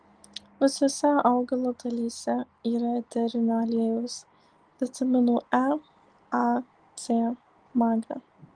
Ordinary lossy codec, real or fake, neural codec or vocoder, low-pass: Opus, 24 kbps; real; none; 9.9 kHz